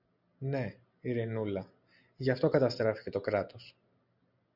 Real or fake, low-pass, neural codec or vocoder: real; 5.4 kHz; none